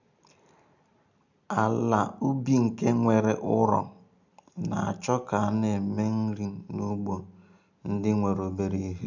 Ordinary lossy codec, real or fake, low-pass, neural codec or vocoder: none; real; 7.2 kHz; none